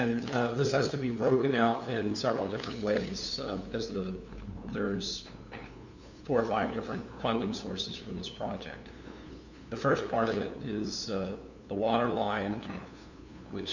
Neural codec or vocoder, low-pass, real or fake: codec, 16 kHz, 2 kbps, FunCodec, trained on LibriTTS, 25 frames a second; 7.2 kHz; fake